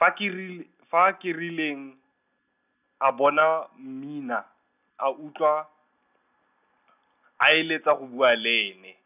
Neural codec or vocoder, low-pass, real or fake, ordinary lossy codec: none; 3.6 kHz; real; none